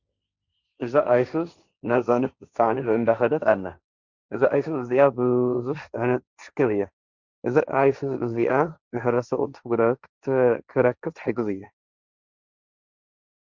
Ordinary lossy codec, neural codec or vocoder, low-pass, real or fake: Opus, 64 kbps; codec, 16 kHz, 1.1 kbps, Voila-Tokenizer; 7.2 kHz; fake